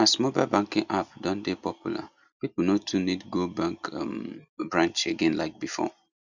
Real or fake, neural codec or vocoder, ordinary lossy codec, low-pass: real; none; none; 7.2 kHz